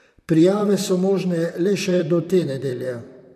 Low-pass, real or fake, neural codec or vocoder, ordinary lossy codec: 14.4 kHz; fake; vocoder, 44.1 kHz, 128 mel bands, Pupu-Vocoder; none